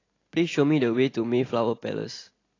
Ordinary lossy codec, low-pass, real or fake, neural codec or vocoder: AAC, 32 kbps; 7.2 kHz; real; none